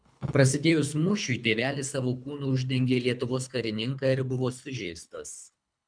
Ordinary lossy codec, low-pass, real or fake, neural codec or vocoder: MP3, 96 kbps; 9.9 kHz; fake; codec, 24 kHz, 3 kbps, HILCodec